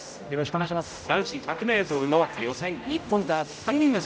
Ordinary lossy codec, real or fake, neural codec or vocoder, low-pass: none; fake; codec, 16 kHz, 0.5 kbps, X-Codec, HuBERT features, trained on general audio; none